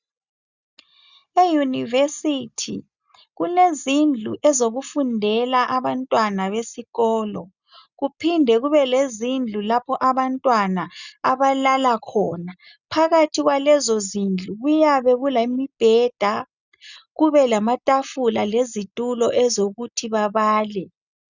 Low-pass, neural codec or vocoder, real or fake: 7.2 kHz; none; real